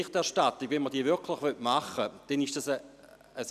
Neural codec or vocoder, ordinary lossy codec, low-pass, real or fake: none; none; 14.4 kHz; real